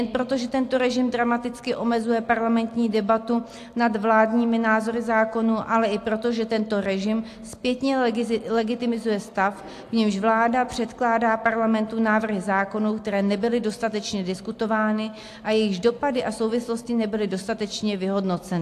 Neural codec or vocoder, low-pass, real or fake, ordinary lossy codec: none; 14.4 kHz; real; AAC, 64 kbps